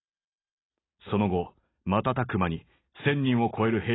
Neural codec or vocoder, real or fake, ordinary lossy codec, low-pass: none; real; AAC, 16 kbps; 7.2 kHz